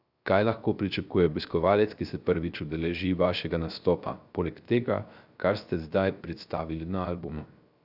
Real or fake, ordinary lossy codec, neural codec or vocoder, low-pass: fake; none; codec, 16 kHz, 0.3 kbps, FocalCodec; 5.4 kHz